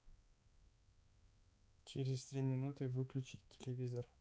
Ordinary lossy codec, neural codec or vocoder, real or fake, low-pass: none; codec, 16 kHz, 4 kbps, X-Codec, HuBERT features, trained on balanced general audio; fake; none